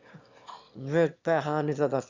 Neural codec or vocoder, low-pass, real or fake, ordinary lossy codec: autoencoder, 22.05 kHz, a latent of 192 numbers a frame, VITS, trained on one speaker; 7.2 kHz; fake; Opus, 64 kbps